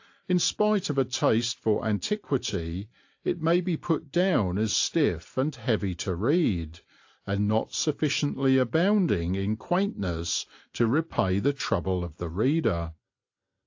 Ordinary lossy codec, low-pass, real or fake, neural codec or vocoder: AAC, 48 kbps; 7.2 kHz; real; none